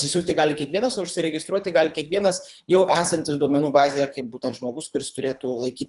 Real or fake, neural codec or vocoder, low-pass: fake; codec, 24 kHz, 3 kbps, HILCodec; 10.8 kHz